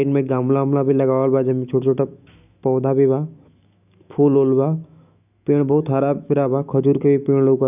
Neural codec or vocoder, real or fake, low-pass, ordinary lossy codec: autoencoder, 48 kHz, 128 numbers a frame, DAC-VAE, trained on Japanese speech; fake; 3.6 kHz; none